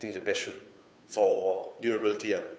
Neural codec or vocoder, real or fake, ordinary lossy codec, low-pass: codec, 16 kHz, 2 kbps, FunCodec, trained on Chinese and English, 25 frames a second; fake; none; none